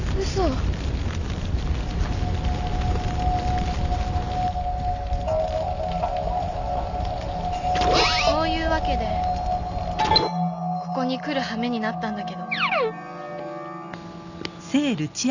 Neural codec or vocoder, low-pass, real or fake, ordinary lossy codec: none; 7.2 kHz; real; none